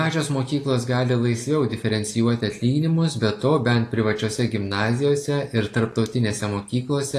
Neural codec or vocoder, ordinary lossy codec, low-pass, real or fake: none; AAC, 48 kbps; 14.4 kHz; real